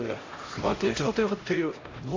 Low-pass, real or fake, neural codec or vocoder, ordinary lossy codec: 7.2 kHz; fake; codec, 16 kHz, 0.5 kbps, X-Codec, HuBERT features, trained on LibriSpeech; AAC, 32 kbps